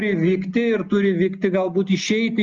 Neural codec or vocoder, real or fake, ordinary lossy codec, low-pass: none; real; Opus, 32 kbps; 7.2 kHz